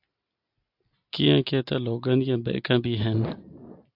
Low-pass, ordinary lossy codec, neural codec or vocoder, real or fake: 5.4 kHz; AAC, 48 kbps; none; real